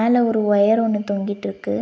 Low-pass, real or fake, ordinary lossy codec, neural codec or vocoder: none; real; none; none